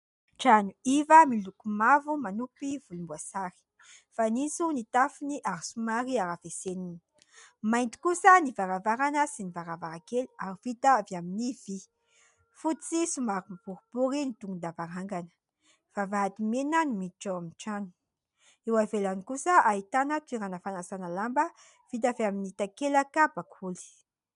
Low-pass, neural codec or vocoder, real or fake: 10.8 kHz; none; real